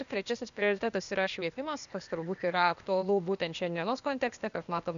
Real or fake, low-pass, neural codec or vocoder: fake; 7.2 kHz; codec, 16 kHz, 0.8 kbps, ZipCodec